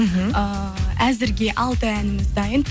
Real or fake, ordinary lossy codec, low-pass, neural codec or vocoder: real; none; none; none